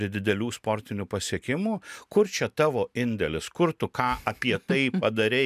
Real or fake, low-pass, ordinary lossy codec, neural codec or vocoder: real; 14.4 kHz; MP3, 96 kbps; none